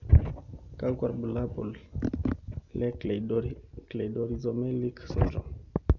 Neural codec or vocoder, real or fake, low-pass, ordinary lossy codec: none; real; 7.2 kHz; none